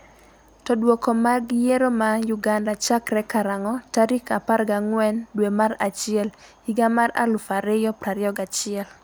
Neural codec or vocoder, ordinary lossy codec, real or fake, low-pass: none; none; real; none